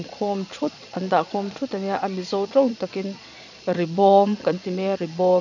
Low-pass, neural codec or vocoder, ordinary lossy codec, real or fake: 7.2 kHz; vocoder, 22.05 kHz, 80 mel bands, Vocos; none; fake